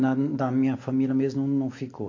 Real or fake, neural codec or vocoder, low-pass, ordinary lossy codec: real; none; 7.2 kHz; MP3, 48 kbps